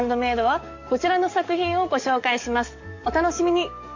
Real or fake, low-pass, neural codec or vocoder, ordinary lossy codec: fake; 7.2 kHz; codec, 44.1 kHz, 7.8 kbps, DAC; AAC, 48 kbps